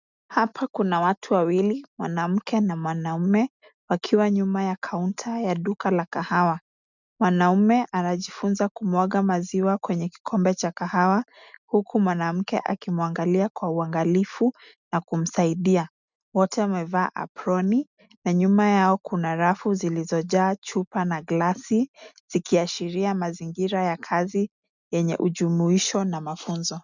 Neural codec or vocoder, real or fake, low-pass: none; real; 7.2 kHz